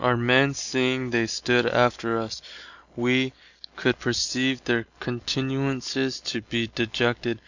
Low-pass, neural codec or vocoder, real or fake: 7.2 kHz; none; real